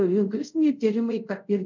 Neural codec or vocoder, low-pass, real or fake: codec, 24 kHz, 0.5 kbps, DualCodec; 7.2 kHz; fake